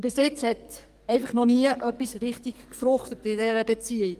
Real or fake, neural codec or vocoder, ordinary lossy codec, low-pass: fake; codec, 44.1 kHz, 2.6 kbps, SNAC; Opus, 24 kbps; 14.4 kHz